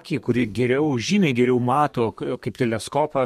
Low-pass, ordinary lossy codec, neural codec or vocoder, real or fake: 14.4 kHz; MP3, 64 kbps; codec, 32 kHz, 1.9 kbps, SNAC; fake